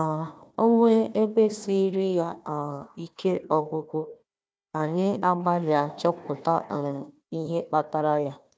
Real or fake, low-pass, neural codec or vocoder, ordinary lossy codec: fake; none; codec, 16 kHz, 1 kbps, FunCodec, trained on Chinese and English, 50 frames a second; none